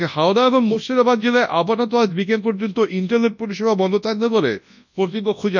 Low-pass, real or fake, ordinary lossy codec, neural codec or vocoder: 7.2 kHz; fake; none; codec, 24 kHz, 0.9 kbps, WavTokenizer, large speech release